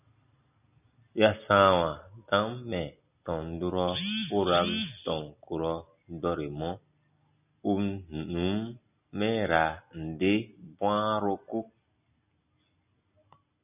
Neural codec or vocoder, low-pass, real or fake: none; 3.6 kHz; real